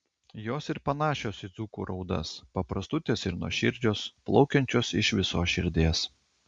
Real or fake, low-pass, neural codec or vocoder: real; 9.9 kHz; none